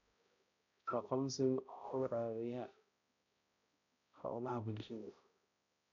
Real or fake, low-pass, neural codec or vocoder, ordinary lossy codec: fake; 7.2 kHz; codec, 16 kHz, 0.5 kbps, X-Codec, HuBERT features, trained on balanced general audio; none